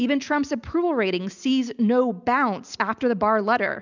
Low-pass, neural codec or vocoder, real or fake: 7.2 kHz; none; real